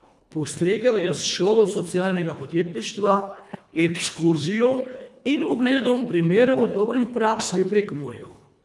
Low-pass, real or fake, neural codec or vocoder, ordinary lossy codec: none; fake; codec, 24 kHz, 1.5 kbps, HILCodec; none